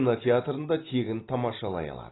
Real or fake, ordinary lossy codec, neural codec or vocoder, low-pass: real; AAC, 16 kbps; none; 7.2 kHz